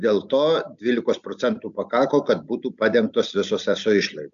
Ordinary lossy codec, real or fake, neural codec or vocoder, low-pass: MP3, 64 kbps; real; none; 7.2 kHz